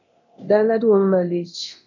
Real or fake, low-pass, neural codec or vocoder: fake; 7.2 kHz; codec, 24 kHz, 0.9 kbps, DualCodec